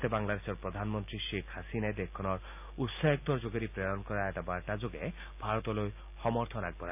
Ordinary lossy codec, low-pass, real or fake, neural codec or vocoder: AAC, 32 kbps; 3.6 kHz; real; none